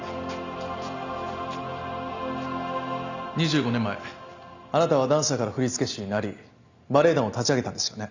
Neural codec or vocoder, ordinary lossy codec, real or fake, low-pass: none; Opus, 64 kbps; real; 7.2 kHz